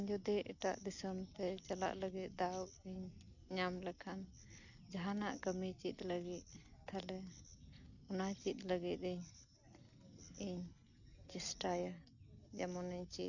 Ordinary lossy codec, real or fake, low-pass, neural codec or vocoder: none; real; 7.2 kHz; none